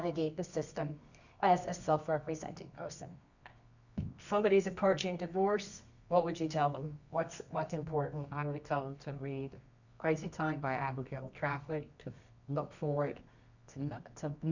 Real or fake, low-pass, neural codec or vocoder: fake; 7.2 kHz; codec, 24 kHz, 0.9 kbps, WavTokenizer, medium music audio release